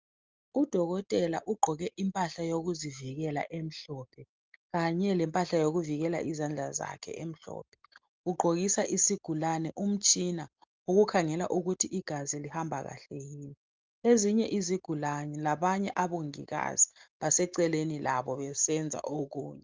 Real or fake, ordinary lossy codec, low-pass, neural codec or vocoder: real; Opus, 32 kbps; 7.2 kHz; none